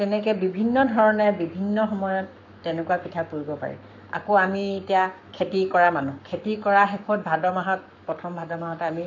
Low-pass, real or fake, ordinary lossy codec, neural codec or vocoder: 7.2 kHz; fake; none; codec, 44.1 kHz, 7.8 kbps, Pupu-Codec